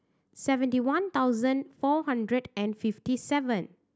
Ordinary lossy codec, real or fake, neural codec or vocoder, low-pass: none; real; none; none